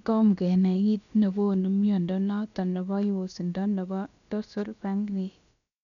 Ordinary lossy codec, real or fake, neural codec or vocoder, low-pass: none; fake; codec, 16 kHz, about 1 kbps, DyCAST, with the encoder's durations; 7.2 kHz